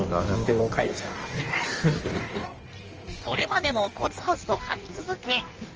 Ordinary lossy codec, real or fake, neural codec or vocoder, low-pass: Opus, 24 kbps; fake; codec, 16 kHz in and 24 kHz out, 1.1 kbps, FireRedTTS-2 codec; 7.2 kHz